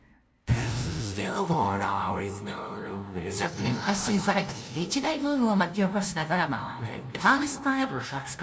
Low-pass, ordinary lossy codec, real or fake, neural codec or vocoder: none; none; fake; codec, 16 kHz, 0.5 kbps, FunCodec, trained on LibriTTS, 25 frames a second